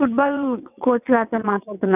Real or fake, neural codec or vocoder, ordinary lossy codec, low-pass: fake; vocoder, 22.05 kHz, 80 mel bands, WaveNeXt; none; 3.6 kHz